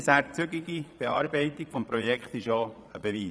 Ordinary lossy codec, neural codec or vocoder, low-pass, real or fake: none; vocoder, 22.05 kHz, 80 mel bands, Vocos; none; fake